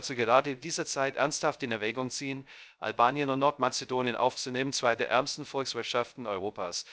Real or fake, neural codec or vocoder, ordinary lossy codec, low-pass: fake; codec, 16 kHz, 0.3 kbps, FocalCodec; none; none